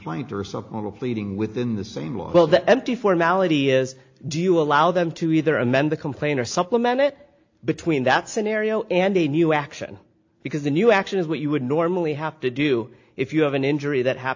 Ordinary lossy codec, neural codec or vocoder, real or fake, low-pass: AAC, 48 kbps; none; real; 7.2 kHz